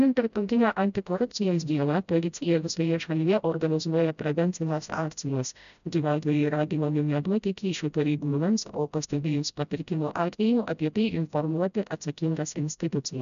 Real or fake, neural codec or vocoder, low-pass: fake; codec, 16 kHz, 0.5 kbps, FreqCodec, smaller model; 7.2 kHz